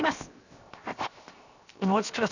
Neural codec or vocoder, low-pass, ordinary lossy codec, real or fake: codec, 16 kHz, 0.7 kbps, FocalCodec; 7.2 kHz; none; fake